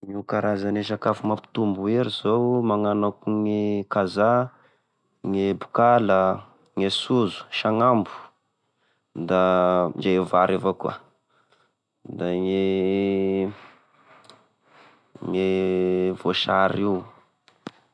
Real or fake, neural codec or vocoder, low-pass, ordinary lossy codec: real; none; none; none